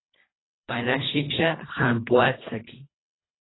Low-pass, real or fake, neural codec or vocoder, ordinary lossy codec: 7.2 kHz; fake; codec, 24 kHz, 1.5 kbps, HILCodec; AAC, 16 kbps